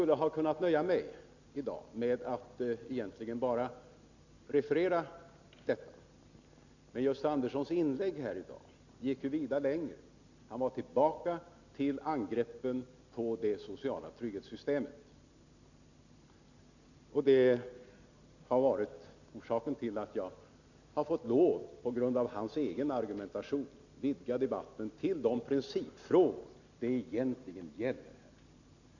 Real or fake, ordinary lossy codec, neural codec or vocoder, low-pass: real; none; none; 7.2 kHz